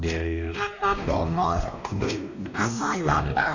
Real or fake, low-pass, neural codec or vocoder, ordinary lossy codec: fake; 7.2 kHz; codec, 16 kHz, 1 kbps, X-Codec, WavLM features, trained on Multilingual LibriSpeech; none